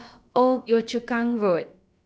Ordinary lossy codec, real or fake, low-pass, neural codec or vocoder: none; fake; none; codec, 16 kHz, about 1 kbps, DyCAST, with the encoder's durations